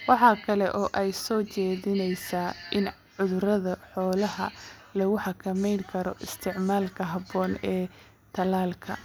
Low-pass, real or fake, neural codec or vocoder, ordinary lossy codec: none; real; none; none